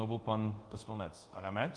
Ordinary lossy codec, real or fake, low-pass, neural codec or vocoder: Opus, 24 kbps; fake; 10.8 kHz; codec, 24 kHz, 0.5 kbps, DualCodec